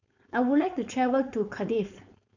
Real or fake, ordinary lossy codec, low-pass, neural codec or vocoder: fake; none; 7.2 kHz; codec, 16 kHz, 4.8 kbps, FACodec